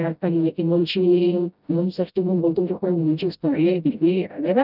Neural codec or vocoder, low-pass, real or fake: codec, 16 kHz, 0.5 kbps, FreqCodec, smaller model; 5.4 kHz; fake